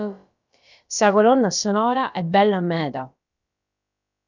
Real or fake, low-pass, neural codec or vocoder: fake; 7.2 kHz; codec, 16 kHz, about 1 kbps, DyCAST, with the encoder's durations